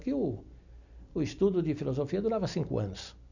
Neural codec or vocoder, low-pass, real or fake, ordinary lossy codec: none; 7.2 kHz; real; none